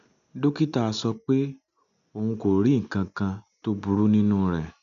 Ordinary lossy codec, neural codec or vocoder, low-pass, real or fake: MP3, 96 kbps; none; 7.2 kHz; real